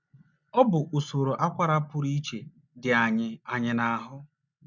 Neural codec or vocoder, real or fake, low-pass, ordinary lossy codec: none; real; 7.2 kHz; none